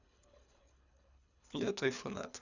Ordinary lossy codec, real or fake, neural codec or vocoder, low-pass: none; fake; codec, 24 kHz, 3 kbps, HILCodec; 7.2 kHz